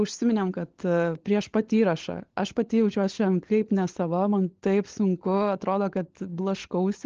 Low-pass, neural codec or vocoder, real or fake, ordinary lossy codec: 7.2 kHz; codec, 16 kHz, 16 kbps, FunCodec, trained on LibriTTS, 50 frames a second; fake; Opus, 16 kbps